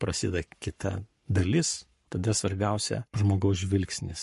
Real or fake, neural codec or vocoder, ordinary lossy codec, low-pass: fake; codec, 44.1 kHz, 7.8 kbps, Pupu-Codec; MP3, 48 kbps; 14.4 kHz